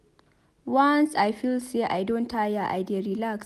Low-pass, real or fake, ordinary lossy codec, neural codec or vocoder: 14.4 kHz; real; none; none